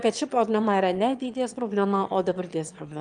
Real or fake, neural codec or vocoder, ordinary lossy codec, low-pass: fake; autoencoder, 22.05 kHz, a latent of 192 numbers a frame, VITS, trained on one speaker; Opus, 32 kbps; 9.9 kHz